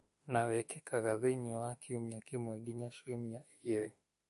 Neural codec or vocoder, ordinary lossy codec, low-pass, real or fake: autoencoder, 48 kHz, 32 numbers a frame, DAC-VAE, trained on Japanese speech; MP3, 48 kbps; 14.4 kHz; fake